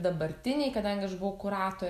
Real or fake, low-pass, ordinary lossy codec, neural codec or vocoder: real; 14.4 kHz; MP3, 96 kbps; none